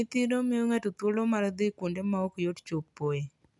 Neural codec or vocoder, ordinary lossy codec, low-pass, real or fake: none; none; 10.8 kHz; real